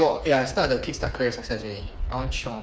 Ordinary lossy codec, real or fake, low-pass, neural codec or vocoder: none; fake; none; codec, 16 kHz, 4 kbps, FreqCodec, smaller model